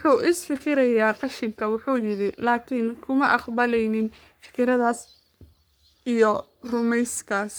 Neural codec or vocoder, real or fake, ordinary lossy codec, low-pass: codec, 44.1 kHz, 3.4 kbps, Pupu-Codec; fake; none; none